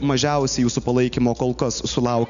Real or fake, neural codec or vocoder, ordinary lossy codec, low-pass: real; none; MP3, 96 kbps; 7.2 kHz